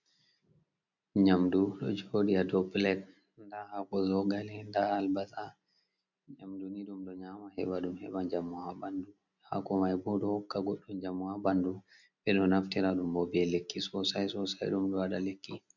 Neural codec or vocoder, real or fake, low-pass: none; real; 7.2 kHz